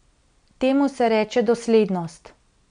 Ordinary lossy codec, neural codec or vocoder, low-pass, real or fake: none; none; 9.9 kHz; real